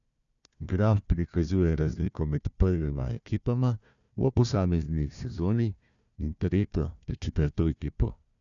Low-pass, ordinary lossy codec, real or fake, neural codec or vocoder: 7.2 kHz; none; fake; codec, 16 kHz, 1 kbps, FunCodec, trained on Chinese and English, 50 frames a second